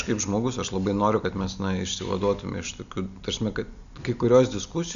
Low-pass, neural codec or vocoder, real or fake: 7.2 kHz; none; real